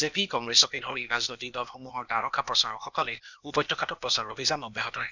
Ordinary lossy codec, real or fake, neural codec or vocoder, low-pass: none; fake; codec, 16 kHz, 0.8 kbps, ZipCodec; 7.2 kHz